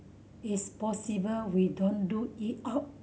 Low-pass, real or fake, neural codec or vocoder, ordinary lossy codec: none; real; none; none